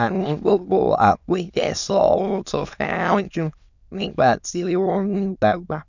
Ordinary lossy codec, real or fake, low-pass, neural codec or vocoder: none; fake; 7.2 kHz; autoencoder, 22.05 kHz, a latent of 192 numbers a frame, VITS, trained on many speakers